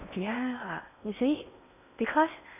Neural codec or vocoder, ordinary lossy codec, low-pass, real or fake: codec, 16 kHz in and 24 kHz out, 0.6 kbps, FocalCodec, streaming, 4096 codes; none; 3.6 kHz; fake